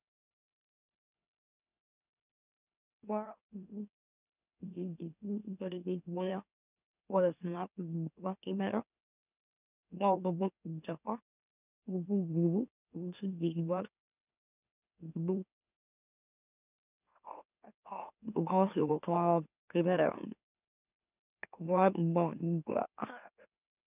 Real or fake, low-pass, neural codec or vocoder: fake; 3.6 kHz; autoencoder, 44.1 kHz, a latent of 192 numbers a frame, MeloTTS